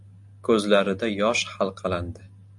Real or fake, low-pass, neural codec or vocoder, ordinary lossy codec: real; 10.8 kHz; none; AAC, 64 kbps